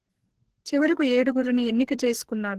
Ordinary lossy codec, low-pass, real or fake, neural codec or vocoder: Opus, 16 kbps; 14.4 kHz; fake; codec, 44.1 kHz, 2.6 kbps, SNAC